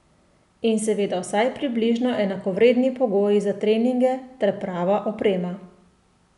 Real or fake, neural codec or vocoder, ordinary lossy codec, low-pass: real; none; none; 10.8 kHz